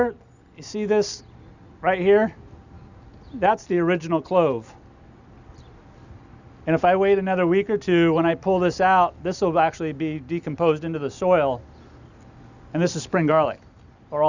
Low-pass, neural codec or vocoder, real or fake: 7.2 kHz; none; real